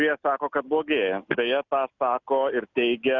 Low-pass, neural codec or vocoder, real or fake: 7.2 kHz; none; real